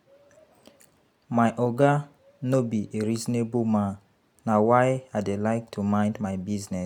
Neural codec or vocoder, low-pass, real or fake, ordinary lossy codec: none; 19.8 kHz; real; none